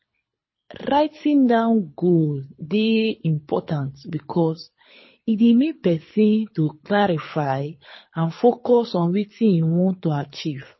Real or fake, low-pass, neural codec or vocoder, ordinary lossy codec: fake; 7.2 kHz; codec, 24 kHz, 6 kbps, HILCodec; MP3, 24 kbps